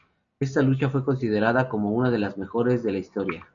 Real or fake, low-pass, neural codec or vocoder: real; 7.2 kHz; none